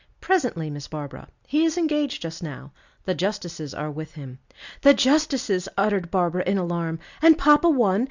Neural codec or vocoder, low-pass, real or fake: none; 7.2 kHz; real